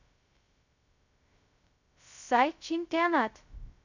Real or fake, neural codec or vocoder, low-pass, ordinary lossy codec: fake; codec, 16 kHz, 0.2 kbps, FocalCodec; 7.2 kHz; none